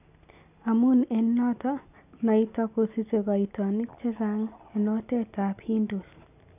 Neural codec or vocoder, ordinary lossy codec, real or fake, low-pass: none; none; real; 3.6 kHz